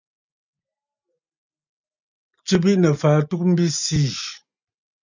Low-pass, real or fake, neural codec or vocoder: 7.2 kHz; real; none